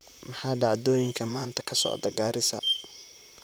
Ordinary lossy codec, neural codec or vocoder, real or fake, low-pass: none; vocoder, 44.1 kHz, 128 mel bands, Pupu-Vocoder; fake; none